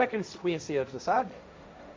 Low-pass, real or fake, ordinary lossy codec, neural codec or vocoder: none; fake; none; codec, 16 kHz, 1.1 kbps, Voila-Tokenizer